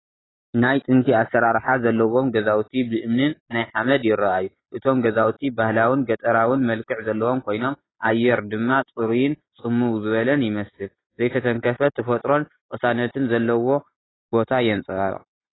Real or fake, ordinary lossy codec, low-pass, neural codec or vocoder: real; AAC, 16 kbps; 7.2 kHz; none